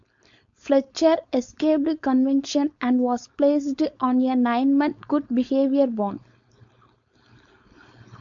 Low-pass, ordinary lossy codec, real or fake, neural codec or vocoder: 7.2 kHz; AAC, 64 kbps; fake; codec, 16 kHz, 4.8 kbps, FACodec